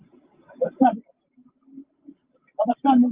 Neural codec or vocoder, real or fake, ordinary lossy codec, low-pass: none; real; Opus, 32 kbps; 3.6 kHz